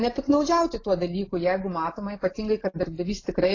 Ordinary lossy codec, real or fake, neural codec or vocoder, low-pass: AAC, 32 kbps; real; none; 7.2 kHz